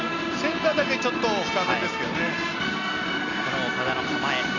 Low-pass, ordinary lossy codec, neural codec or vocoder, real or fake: 7.2 kHz; Opus, 64 kbps; none; real